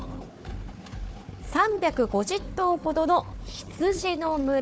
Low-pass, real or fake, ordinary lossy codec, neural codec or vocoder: none; fake; none; codec, 16 kHz, 4 kbps, FunCodec, trained on Chinese and English, 50 frames a second